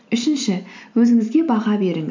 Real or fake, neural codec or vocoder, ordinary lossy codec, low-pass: real; none; MP3, 64 kbps; 7.2 kHz